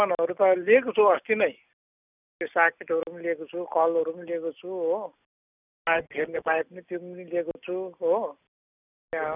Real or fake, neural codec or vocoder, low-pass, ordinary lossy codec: real; none; 3.6 kHz; none